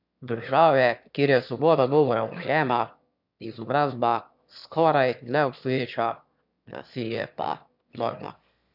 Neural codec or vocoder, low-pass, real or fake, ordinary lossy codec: autoencoder, 22.05 kHz, a latent of 192 numbers a frame, VITS, trained on one speaker; 5.4 kHz; fake; none